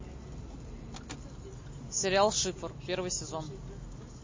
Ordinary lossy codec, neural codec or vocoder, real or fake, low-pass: MP3, 32 kbps; none; real; 7.2 kHz